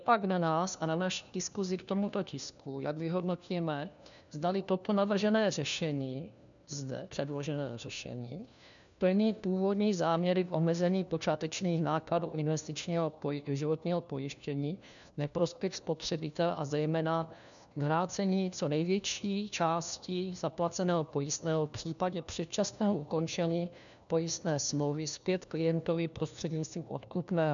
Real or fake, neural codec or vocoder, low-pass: fake; codec, 16 kHz, 1 kbps, FunCodec, trained on LibriTTS, 50 frames a second; 7.2 kHz